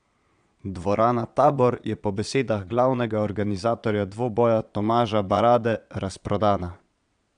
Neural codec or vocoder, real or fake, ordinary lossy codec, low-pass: vocoder, 22.05 kHz, 80 mel bands, Vocos; fake; none; 9.9 kHz